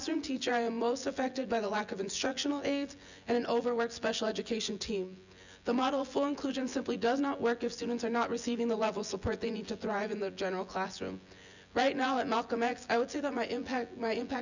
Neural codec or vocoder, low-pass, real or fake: vocoder, 24 kHz, 100 mel bands, Vocos; 7.2 kHz; fake